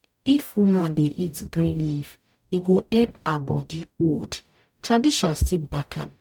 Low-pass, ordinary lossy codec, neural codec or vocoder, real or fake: 19.8 kHz; none; codec, 44.1 kHz, 0.9 kbps, DAC; fake